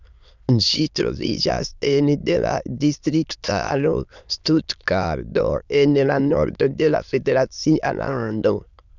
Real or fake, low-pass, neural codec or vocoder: fake; 7.2 kHz; autoencoder, 22.05 kHz, a latent of 192 numbers a frame, VITS, trained on many speakers